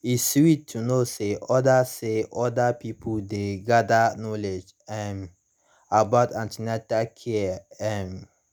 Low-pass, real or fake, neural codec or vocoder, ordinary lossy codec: none; real; none; none